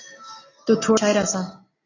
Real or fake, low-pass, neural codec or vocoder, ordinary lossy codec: real; 7.2 kHz; none; AAC, 32 kbps